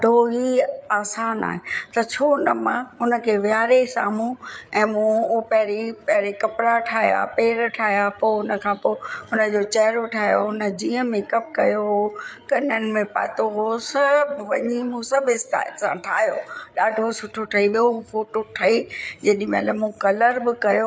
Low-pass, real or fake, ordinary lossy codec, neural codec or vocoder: none; fake; none; codec, 16 kHz, 8 kbps, FreqCodec, larger model